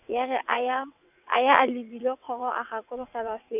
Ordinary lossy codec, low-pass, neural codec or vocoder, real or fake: none; 3.6 kHz; vocoder, 22.05 kHz, 80 mel bands, WaveNeXt; fake